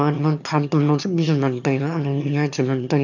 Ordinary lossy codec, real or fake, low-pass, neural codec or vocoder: none; fake; 7.2 kHz; autoencoder, 22.05 kHz, a latent of 192 numbers a frame, VITS, trained on one speaker